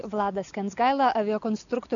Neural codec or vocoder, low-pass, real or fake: none; 7.2 kHz; real